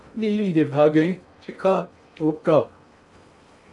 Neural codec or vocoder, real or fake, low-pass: codec, 16 kHz in and 24 kHz out, 0.6 kbps, FocalCodec, streaming, 2048 codes; fake; 10.8 kHz